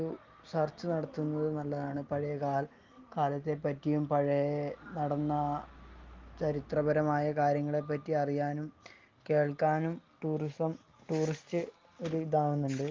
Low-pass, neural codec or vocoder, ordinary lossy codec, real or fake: 7.2 kHz; none; Opus, 32 kbps; real